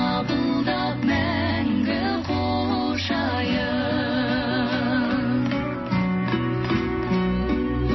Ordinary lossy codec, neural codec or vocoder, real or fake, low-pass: MP3, 24 kbps; none; real; 7.2 kHz